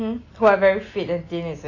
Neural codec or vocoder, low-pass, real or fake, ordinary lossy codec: none; 7.2 kHz; real; AAC, 32 kbps